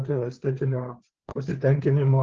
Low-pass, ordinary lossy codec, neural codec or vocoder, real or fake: 7.2 kHz; Opus, 16 kbps; codec, 16 kHz, 4 kbps, FreqCodec, larger model; fake